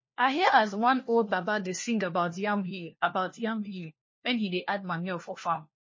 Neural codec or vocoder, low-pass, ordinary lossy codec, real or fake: codec, 16 kHz, 1 kbps, FunCodec, trained on LibriTTS, 50 frames a second; 7.2 kHz; MP3, 32 kbps; fake